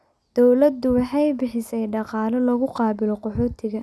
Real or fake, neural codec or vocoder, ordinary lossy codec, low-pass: real; none; none; none